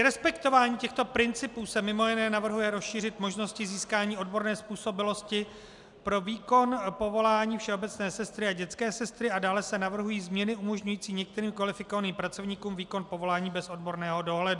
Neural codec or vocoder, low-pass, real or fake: none; 10.8 kHz; real